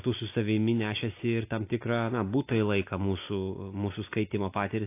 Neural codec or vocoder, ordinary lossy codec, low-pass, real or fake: none; AAC, 24 kbps; 3.6 kHz; real